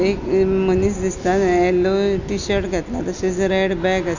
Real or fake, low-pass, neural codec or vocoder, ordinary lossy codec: real; 7.2 kHz; none; none